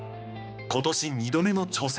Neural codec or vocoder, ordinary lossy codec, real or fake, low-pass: codec, 16 kHz, 2 kbps, X-Codec, HuBERT features, trained on balanced general audio; none; fake; none